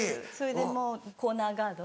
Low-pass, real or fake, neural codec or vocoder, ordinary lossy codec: none; real; none; none